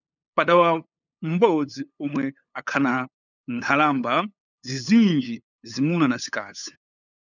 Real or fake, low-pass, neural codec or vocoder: fake; 7.2 kHz; codec, 16 kHz, 8 kbps, FunCodec, trained on LibriTTS, 25 frames a second